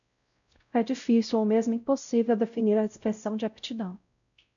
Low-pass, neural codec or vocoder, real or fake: 7.2 kHz; codec, 16 kHz, 0.5 kbps, X-Codec, WavLM features, trained on Multilingual LibriSpeech; fake